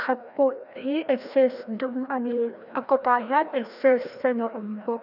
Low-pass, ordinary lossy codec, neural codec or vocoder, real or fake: 5.4 kHz; none; codec, 16 kHz, 1 kbps, FreqCodec, larger model; fake